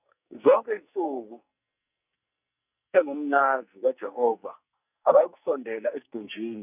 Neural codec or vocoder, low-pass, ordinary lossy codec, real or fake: codec, 44.1 kHz, 2.6 kbps, SNAC; 3.6 kHz; none; fake